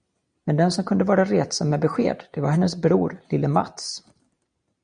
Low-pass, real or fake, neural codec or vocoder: 9.9 kHz; real; none